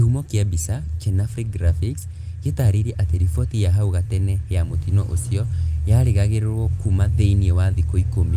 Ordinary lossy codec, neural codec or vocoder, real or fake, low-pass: Opus, 32 kbps; none; real; 14.4 kHz